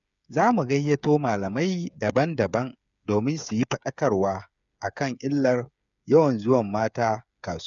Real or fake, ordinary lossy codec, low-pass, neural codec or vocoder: fake; none; 7.2 kHz; codec, 16 kHz, 8 kbps, FreqCodec, smaller model